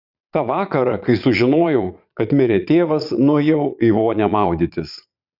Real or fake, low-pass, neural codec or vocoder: fake; 5.4 kHz; vocoder, 22.05 kHz, 80 mel bands, Vocos